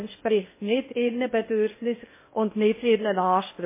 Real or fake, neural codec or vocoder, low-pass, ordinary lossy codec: fake; codec, 16 kHz in and 24 kHz out, 0.6 kbps, FocalCodec, streaming, 2048 codes; 3.6 kHz; MP3, 16 kbps